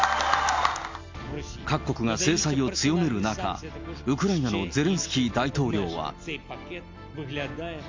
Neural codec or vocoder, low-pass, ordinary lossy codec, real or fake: none; 7.2 kHz; MP3, 64 kbps; real